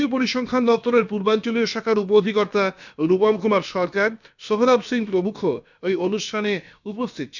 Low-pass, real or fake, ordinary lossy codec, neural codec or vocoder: 7.2 kHz; fake; none; codec, 16 kHz, about 1 kbps, DyCAST, with the encoder's durations